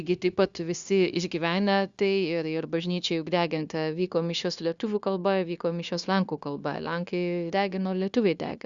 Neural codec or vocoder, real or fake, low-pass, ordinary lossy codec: codec, 16 kHz, 0.9 kbps, LongCat-Audio-Codec; fake; 7.2 kHz; Opus, 64 kbps